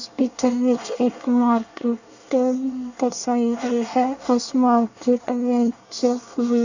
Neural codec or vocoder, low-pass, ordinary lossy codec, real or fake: codec, 24 kHz, 1 kbps, SNAC; 7.2 kHz; none; fake